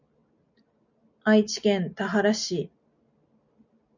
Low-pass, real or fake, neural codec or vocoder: 7.2 kHz; real; none